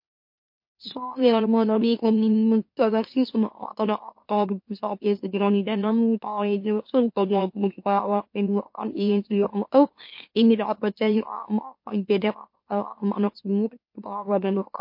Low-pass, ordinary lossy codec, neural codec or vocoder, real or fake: 5.4 kHz; MP3, 32 kbps; autoencoder, 44.1 kHz, a latent of 192 numbers a frame, MeloTTS; fake